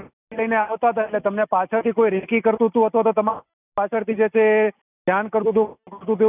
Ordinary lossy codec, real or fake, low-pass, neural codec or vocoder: none; real; 3.6 kHz; none